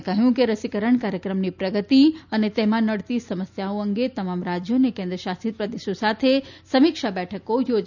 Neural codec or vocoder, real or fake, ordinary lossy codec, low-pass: none; real; none; 7.2 kHz